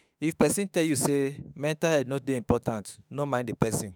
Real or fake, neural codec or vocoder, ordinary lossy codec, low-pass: fake; autoencoder, 48 kHz, 32 numbers a frame, DAC-VAE, trained on Japanese speech; none; none